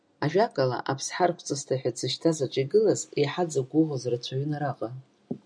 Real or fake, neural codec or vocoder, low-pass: real; none; 9.9 kHz